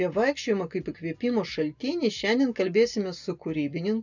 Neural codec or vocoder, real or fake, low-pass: none; real; 7.2 kHz